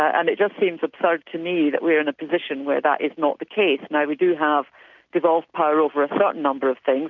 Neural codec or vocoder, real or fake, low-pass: none; real; 7.2 kHz